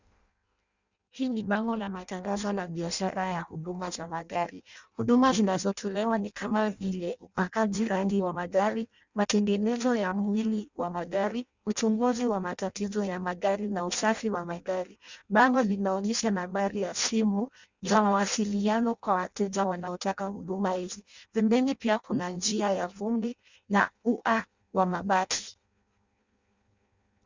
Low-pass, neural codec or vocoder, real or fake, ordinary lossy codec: 7.2 kHz; codec, 16 kHz in and 24 kHz out, 0.6 kbps, FireRedTTS-2 codec; fake; Opus, 64 kbps